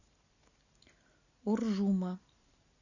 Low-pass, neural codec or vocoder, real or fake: 7.2 kHz; none; real